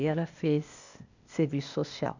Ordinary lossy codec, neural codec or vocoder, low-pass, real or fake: none; codec, 16 kHz, 0.8 kbps, ZipCodec; 7.2 kHz; fake